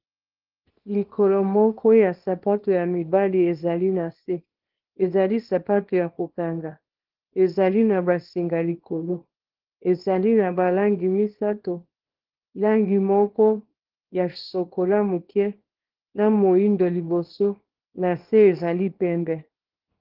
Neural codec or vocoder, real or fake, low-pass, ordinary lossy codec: codec, 24 kHz, 0.9 kbps, WavTokenizer, small release; fake; 5.4 kHz; Opus, 16 kbps